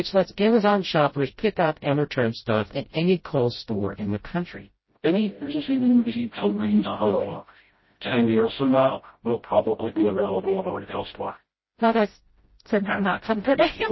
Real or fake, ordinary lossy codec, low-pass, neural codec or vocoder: fake; MP3, 24 kbps; 7.2 kHz; codec, 16 kHz, 0.5 kbps, FreqCodec, smaller model